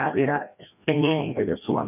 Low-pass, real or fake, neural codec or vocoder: 3.6 kHz; fake; codec, 16 kHz, 1 kbps, FreqCodec, larger model